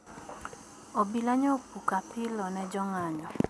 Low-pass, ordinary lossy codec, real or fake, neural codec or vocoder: none; none; real; none